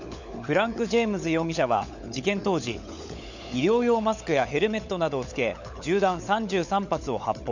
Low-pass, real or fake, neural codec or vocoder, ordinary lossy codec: 7.2 kHz; fake; codec, 16 kHz, 16 kbps, FunCodec, trained on LibriTTS, 50 frames a second; none